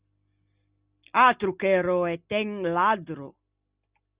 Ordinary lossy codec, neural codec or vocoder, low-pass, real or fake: Opus, 64 kbps; none; 3.6 kHz; real